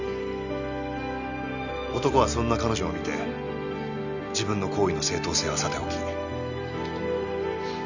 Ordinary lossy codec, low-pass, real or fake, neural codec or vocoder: none; 7.2 kHz; real; none